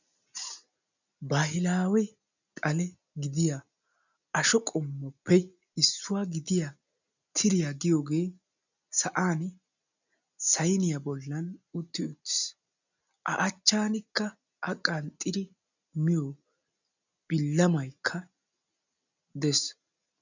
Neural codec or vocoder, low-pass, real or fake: none; 7.2 kHz; real